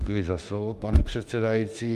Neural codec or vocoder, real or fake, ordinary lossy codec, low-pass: autoencoder, 48 kHz, 32 numbers a frame, DAC-VAE, trained on Japanese speech; fake; Opus, 32 kbps; 14.4 kHz